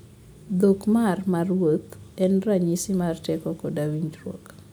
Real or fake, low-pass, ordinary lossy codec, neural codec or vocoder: real; none; none; none